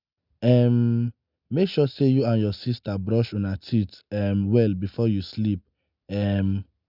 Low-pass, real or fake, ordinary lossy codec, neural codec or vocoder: 5.4 kHz; real; none; none